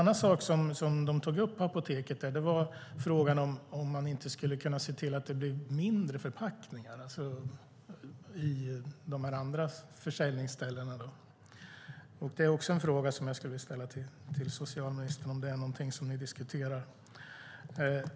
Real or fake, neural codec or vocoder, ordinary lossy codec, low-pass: real; none; none; none